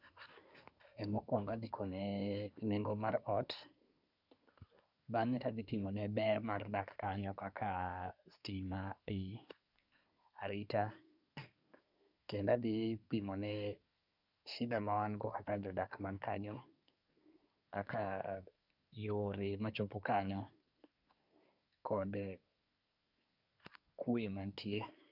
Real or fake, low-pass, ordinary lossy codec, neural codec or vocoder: fake; 5.4 kHz; none; codec, 24 kHz, 1 kbps, SNAC